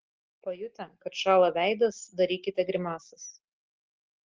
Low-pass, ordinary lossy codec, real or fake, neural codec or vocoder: 7.2 kHz; Opus, 16 kbps; real; none